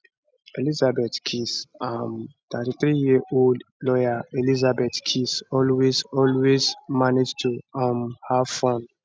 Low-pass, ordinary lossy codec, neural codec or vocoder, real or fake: none; none; none; real